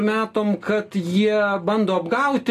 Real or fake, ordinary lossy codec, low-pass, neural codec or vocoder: real; AAC, 48 kbps; 14.4 kHz; none